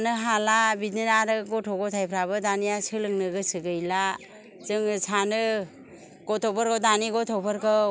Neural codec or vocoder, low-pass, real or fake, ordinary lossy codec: none; none; real; none